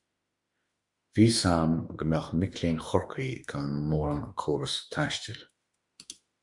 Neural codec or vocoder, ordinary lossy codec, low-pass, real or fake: autoencoder, 48 kHz, 32 numbers a frame, DAC-VAE, trained on Japanese speech; Opus, 64 kbps; 10.8 kHz; fake